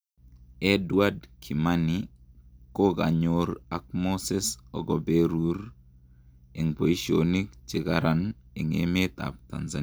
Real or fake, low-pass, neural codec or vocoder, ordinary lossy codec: real; none; none; none